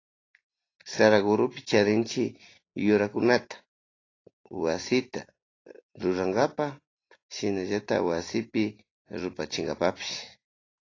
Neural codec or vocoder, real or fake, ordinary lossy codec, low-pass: none; real; AAC, 32 kbps; 7.2 kHz